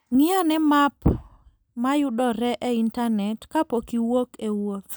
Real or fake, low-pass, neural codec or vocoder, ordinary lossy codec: real; none; none; none